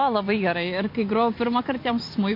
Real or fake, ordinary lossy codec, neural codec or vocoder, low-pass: fake; MP3, 32 kbps; vocoder, 44.1 kHz, 128 mel bands every 256 samples, BigVGAN v2; 5.4 kHz